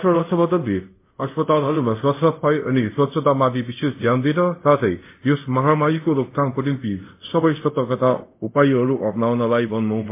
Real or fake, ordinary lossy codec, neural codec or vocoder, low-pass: fake; MP3, 24 kbps; codec, 24 kHz, 0.5 kbps, DualCodec; 3.6 kHz